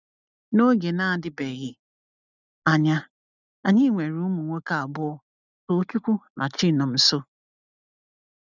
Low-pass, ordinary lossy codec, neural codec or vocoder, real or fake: 7.2 kHz; none; none; real